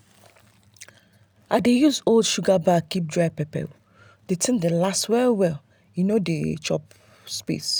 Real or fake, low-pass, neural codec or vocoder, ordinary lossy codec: real; none; none; none